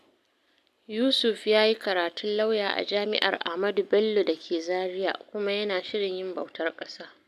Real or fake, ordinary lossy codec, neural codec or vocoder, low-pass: real; none; none; 14.4 kHz